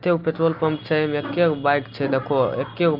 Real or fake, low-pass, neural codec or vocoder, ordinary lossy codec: real; 5.4 kHz; none; Opus, 24 kbps